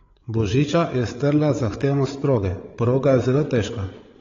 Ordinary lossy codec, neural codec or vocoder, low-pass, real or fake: AAC, 32 kbps; codec, 16 kHz, 8 kbps, FreqCodec, larger model; 7.2 kHz; fake